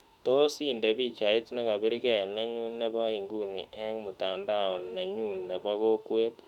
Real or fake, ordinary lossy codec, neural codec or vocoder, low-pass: fake; none; autoencoder, 48 kHz, 32 numbers a frame, DAC-VAE, trained on Japanese speech; 19.8 kHz